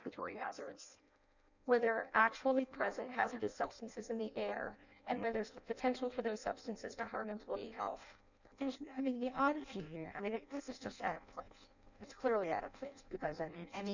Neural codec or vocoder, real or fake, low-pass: codec, 16 kHz in and 24 kHz out, 0.6 kbps, FireRedTTS-2 codec; fake; 7.2 kHz